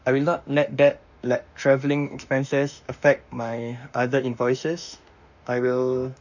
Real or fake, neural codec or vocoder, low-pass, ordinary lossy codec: fake; autoencoder, 48 kHz, 32 numbers a frame, DAC-VAE, trained on Japanese speech; 7.2 kHz; none